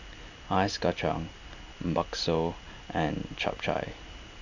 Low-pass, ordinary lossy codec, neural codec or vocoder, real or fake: 7.2 kHz; none; none; real